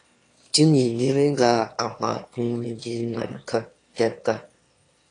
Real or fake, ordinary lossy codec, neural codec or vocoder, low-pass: fake; AAC, 48 kbps; autoencoder, 22.05 kHz, a latent of 192 numbers a frame, VITS, trained on one speaker; 9.9 kHz